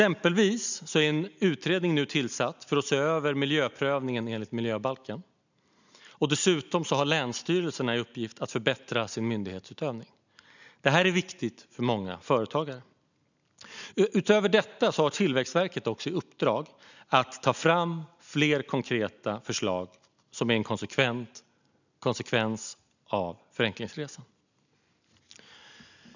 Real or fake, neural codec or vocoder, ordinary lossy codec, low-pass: real; none; none; 7.2 kHz